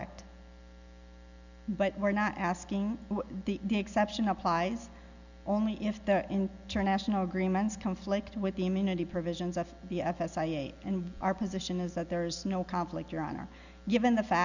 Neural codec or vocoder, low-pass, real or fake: none; 7.2 kHz; real